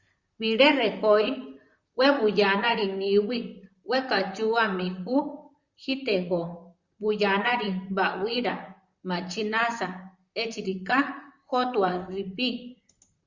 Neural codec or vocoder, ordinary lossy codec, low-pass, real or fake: vocoder, 44.1 kHz, 128 mel bands, Pupu-Vocoder; Opus, 64 kbps; 7.2 kHz; fake